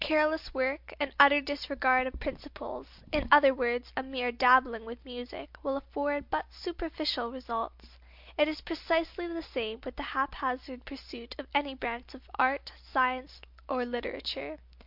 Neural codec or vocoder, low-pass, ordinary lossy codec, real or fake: none; 5.4 kHz; MP3, 48 kbps; real